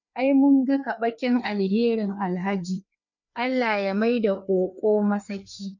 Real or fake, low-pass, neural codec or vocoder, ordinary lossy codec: fake; 7.2 kHz; codec, 16 kHz, 2 kbps, FreqCodec, larger model; none